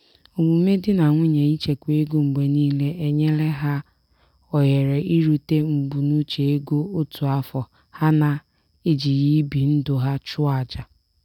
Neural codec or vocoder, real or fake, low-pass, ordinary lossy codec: none; real; 19.8 kHz; none